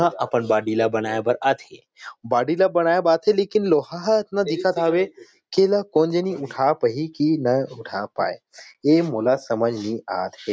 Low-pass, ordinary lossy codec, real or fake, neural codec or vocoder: none; none; real; none